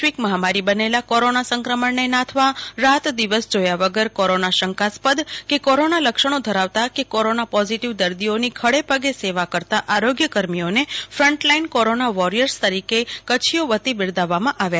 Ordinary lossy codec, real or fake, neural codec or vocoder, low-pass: none; real; none; none